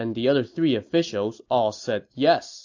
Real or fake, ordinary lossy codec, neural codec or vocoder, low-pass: real; AAC, 48 kbps; none; 7.2 kHz